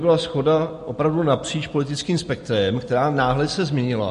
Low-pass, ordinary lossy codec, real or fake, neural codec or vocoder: 14.4 kHz; MP3, 48 kbps; real; none